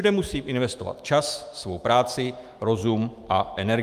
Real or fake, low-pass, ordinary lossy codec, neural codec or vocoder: fake; 14.4 kHz; Opus, 32 kbps; autoencoder, 48 kHz, 128 numbers a frame, DAC-VAE, trained on Japanese speech